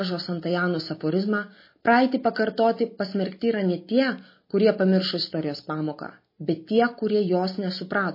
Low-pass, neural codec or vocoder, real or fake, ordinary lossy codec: 5.4 kHz; none; real; MP3, 24 kbps